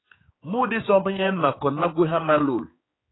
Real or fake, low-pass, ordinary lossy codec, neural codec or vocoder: fake; 7.2 kHz; AAC, 16 kbps; codec, 16 kHz, 4 kbps, X-Codec, HuBERT features, trained on general audio